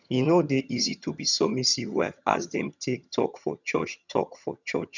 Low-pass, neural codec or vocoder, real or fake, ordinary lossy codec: 7.2 kHz; vocoder, 22.05 kHz, 80 mel bands, HiFi-GAN; fake; none